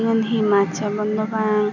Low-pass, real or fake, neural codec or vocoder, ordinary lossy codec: 7.2 kHz; real; none; none